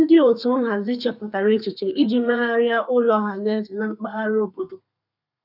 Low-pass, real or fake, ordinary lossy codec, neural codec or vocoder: 5.4 kHz; fake; none; codec, 32 kHz, 1.9 kbps, SNAC